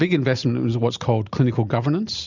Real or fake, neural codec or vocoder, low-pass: real; none; 7.2 kHz